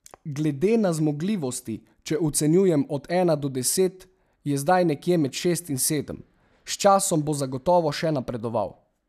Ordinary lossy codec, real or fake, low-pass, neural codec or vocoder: none; real; 14.4 kHz; none